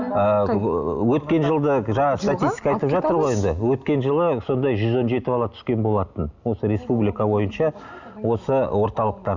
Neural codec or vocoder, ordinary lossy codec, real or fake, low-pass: none; none; real; 7.2 kHz